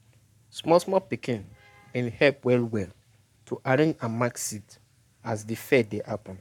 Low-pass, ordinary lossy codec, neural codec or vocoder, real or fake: 19.8 kHz; none; codec, 44.1 kHz, 7.8 kbps, Pupu-Codec; fake